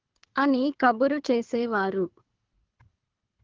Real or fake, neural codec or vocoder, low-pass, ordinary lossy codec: fake; codec, 24 kHz, 3 kbps, HILCodec; 7.2 kHz; Opus, 16 kbps